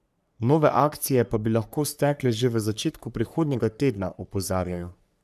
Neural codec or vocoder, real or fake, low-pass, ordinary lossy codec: codec, 44.1 kHz, 3.4 kbps, Pupu-Codec; fake; 14.4 kHz; none